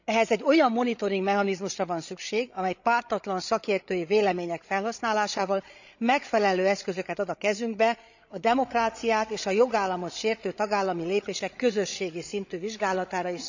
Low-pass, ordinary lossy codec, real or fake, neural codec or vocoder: 7.2 kHz; none; fake; codec, 16 kHz, 16 kbps, FreqCodec, larger model